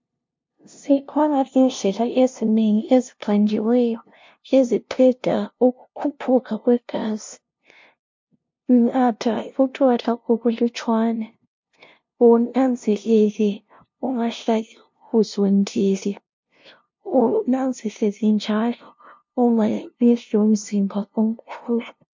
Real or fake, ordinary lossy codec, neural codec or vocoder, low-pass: fake; MP3, 48 kbps; codec, 16 kHz, 0.5 kbps, FunCodec, trained on LibriTTS, 25 frames a second; 7.2 kHz